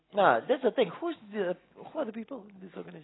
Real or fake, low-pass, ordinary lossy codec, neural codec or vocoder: real; 7.2 kHz; AAC, 16 kbps; none